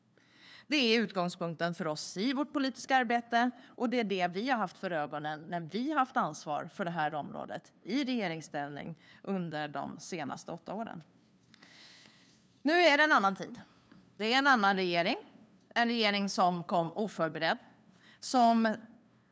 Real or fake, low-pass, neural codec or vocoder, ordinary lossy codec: fake; none; codec, 16 kHz, 2 kbps, FunCodec, trained on LibriTTS, 25 frames a second; none